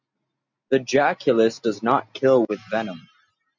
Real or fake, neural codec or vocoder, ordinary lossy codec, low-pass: real; none; MP3, 64 kbps; 7.2 kHz